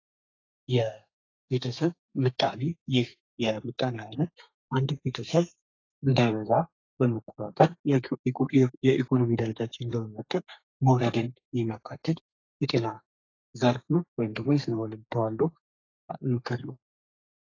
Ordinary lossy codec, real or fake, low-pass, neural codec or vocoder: AAC, 32 kbps; fake; 7.2 kHz; codec, 32 kHz, 1.9 kbps, SNAC